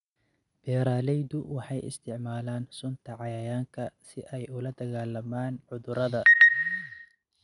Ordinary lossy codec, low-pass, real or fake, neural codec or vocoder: none; 10.8 kHz; real; none